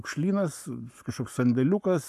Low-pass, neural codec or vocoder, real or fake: 14.4 kHz; codec, 44.1 kHz, 7.8 kbps, Pupu-Codec; fake